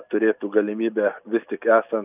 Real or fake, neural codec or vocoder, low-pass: real; none; 3.6 kHz